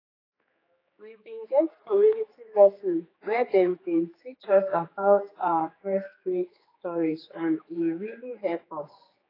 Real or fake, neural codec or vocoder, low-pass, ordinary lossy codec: fake; codec, 16 kHz, 4 kbps, X-Codec, HuBERT features, trained on general audio; 5.4 kHz; AAC, 24 kbps